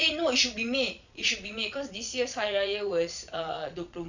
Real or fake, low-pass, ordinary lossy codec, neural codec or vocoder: fake; 7.2 kHz; none; vocoder, 22.05 kHz, 80 mel bands, Vocos